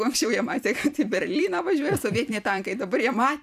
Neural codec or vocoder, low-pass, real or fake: vocoder, 44.1 kHz, 128 mel bands every 256 samples, BigVGAN v2; 14.4 kHz; fake